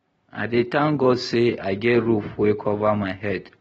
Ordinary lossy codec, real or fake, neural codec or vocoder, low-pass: AAC, 24 kbps; real; none; 19.8 kHz